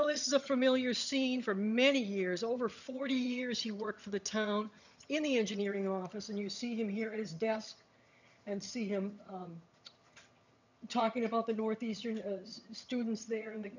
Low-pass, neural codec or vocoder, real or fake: 7.2 kHz; vocoder, 22.05 kHz, 80 mel bands, HiFi-GAN; fake